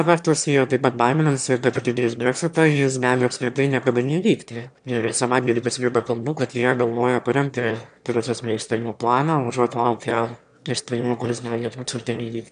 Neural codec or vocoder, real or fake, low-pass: autoencoder, 22.05 kHz, a latent of 192 numbers a frame, VITS, trained on one speaker; fake; 9.9 kHz